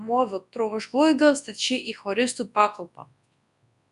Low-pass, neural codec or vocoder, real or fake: 10.8 kHz; codec, 24 kHz, 0.9 kbps, WavTokenizer, large speech release; fake